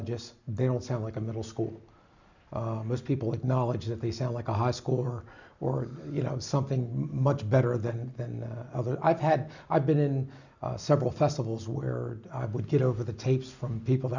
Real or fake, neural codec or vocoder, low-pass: real; none; 7.2 kHz